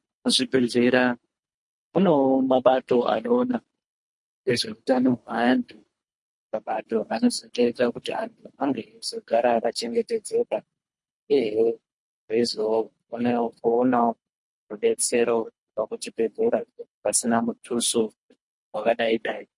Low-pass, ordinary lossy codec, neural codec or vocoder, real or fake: 10.8 kHz; MP3, 48 kbps; codec, 24 kHz, 3 kbps, HILCodec; fake